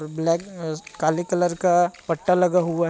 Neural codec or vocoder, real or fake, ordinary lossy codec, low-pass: none; real; none; none